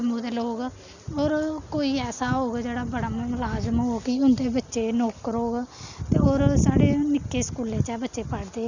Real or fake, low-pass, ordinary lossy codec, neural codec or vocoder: real; 7.2 kHz; none; none